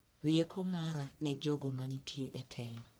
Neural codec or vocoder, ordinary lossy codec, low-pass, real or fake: codec, 44.1 kHz, 1.7 kbps, Pupu-Codec; none; none; fake